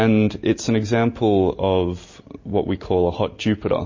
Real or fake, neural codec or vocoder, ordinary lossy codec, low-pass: real; none; MP3, 32 kbps; 7.2 kHz